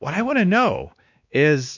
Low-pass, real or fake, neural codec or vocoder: 7.2 kHz; fake; codec, 16 kHz, 2 kbps, X-Codec, WavLM features, trained on Multilingual LibriSpeech